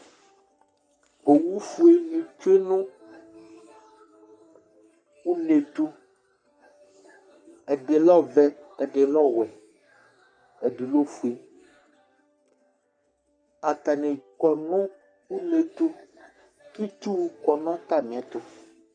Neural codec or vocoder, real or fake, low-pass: codec, 44.1 kHz, 3.4 kbps, Pupu-Codec; fake; 9.9 kHz